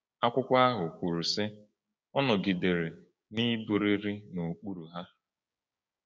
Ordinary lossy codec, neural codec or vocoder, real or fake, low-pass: none; codec, 16 kHz, 6 kbps, DAC; fake; none